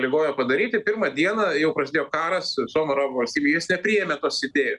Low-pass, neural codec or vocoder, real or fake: 10.8 kHz; none; real